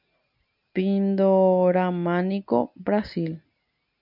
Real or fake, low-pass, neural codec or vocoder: real; 5.4 kHz; none